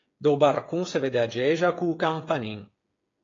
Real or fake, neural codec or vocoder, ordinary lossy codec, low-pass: fake; codec, 16 kHz, 8 kbps, FreqCodec, smaller model; AAC, 32 kbps; 7.2 kHz